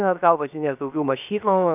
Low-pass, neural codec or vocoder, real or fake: 3.6 kHz; codec, 16 kHz, 0.7 kbps, FocalCodec; fake